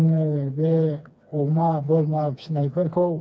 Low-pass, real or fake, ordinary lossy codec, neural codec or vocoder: none; fake; none; codec, 16 kHz, 2 kbps, FreqCodec, smaller model